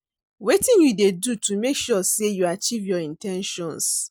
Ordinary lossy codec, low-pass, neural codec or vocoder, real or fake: none; none; none; real